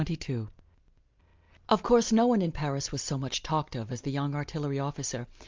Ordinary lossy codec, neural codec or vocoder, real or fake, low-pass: Opus, 24 kbps; none; real; 7.2 kHz